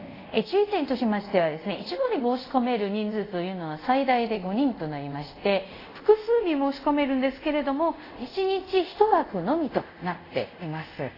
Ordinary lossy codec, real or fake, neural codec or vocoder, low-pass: AAC, 24 kbps; fake; codec, 24 kHz, 0.5 kbps, DualCodec; 5.4 kHz